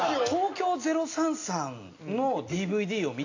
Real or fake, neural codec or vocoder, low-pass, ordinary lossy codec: real; none; 7.2 kHz; AAC, 48 kbps